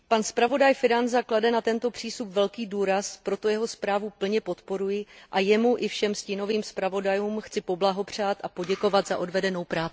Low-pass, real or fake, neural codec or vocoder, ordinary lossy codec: none; real; none; none